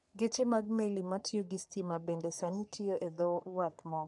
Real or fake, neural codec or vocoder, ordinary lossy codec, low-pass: fake; codec, 44.1 kHz, 3.4 kbps, Pupu-Codec; none; 10.8 kHz